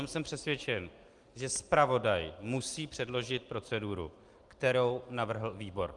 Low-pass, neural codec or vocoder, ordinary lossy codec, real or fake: 10.8 kHz; none; Opus, 32 kbps; real